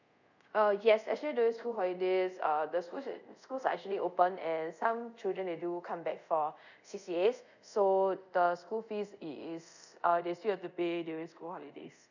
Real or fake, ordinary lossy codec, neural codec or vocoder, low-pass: fake; none; codec, 24 kHz, 0.5 kbps, DualCodec; 7.2 kHz